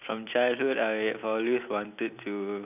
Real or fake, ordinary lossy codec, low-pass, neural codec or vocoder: real; none; 3.6 kHz; none